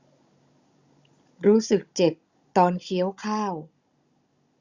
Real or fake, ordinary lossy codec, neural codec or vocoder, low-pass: fake; Opus, 64 kbps; codec, 16 kHz, 16 kbps, FunCodec, trained on Chinese and English, 50 frames a second; 7.2 kHz